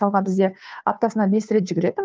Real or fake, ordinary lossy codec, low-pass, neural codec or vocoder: fake; none; none; codec, 16 kHz, 2 kbps, FunCodec, trained on Chinese and English, 25 frames a second